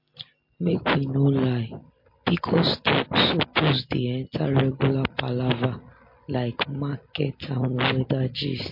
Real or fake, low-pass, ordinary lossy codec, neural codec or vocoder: real; 5.4 kHz; MP3, 32 kbps; none